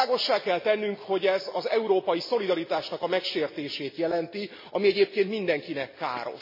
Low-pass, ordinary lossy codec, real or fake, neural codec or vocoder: 5.4 kHz; MP3, 24 kbps; real; none